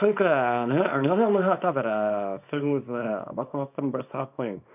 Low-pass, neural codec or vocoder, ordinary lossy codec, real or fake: 3.6 kHz; codec, 24 kHz, 0.9 kbps, WavTokenizer, small release; none; fake